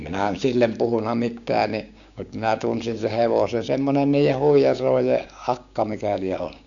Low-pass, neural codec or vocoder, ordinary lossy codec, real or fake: 7.2 kHz; codec, 16 kHz, 6 kbps, DAC; none; fake